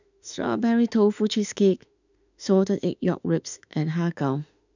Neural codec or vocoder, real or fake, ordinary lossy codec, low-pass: autoencoder, 48 kHz, 32 numbers a frame, DAC-VAE, trained on Japanese speech; fake; none; 7.2 kHz